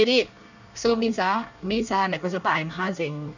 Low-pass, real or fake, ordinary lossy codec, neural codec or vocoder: 7.2 kHz; fake; none; codec, 24 kHz, 1 kbps, SNAC